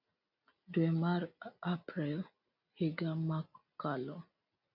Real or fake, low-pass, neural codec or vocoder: real; 5.4 kHz; none